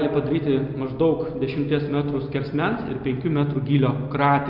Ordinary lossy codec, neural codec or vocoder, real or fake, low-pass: Opus, 24 kbps; none; real; 5.4 kHz